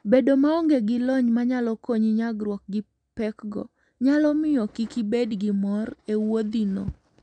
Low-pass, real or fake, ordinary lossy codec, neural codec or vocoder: 9.9 kHz; real; none; none